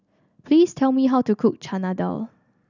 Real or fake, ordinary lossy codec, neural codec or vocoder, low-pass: real; none; none; 7.2 kHz